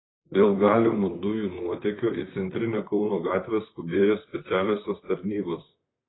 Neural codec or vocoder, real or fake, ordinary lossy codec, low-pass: vocoder, 44.1 kHz, 128 mel bands, Pupu-Vocoder; fake; AAC, 16 kbps; 7.2 kHz